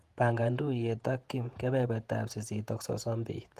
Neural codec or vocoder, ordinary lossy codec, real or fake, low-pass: vocoder, 48 kHz, 128 mel bands, Vocos; Opus, 24 kbps; fake; 14.4 kHz